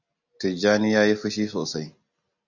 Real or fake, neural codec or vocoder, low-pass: real; none; 7.2 kHz